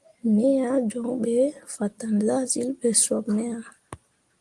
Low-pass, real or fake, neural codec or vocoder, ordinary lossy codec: 10.8 kHz; real; none; Opus, 24 kbps